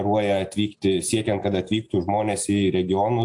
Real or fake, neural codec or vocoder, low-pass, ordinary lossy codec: real; none; 10.8 kHz; AAC, 64 kbps